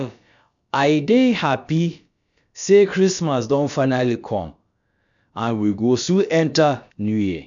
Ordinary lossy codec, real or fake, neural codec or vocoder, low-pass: none; fake; codec, 16 kHz, about 1 kbps, DyCAST, with the encoder's durations; 7.2 kHz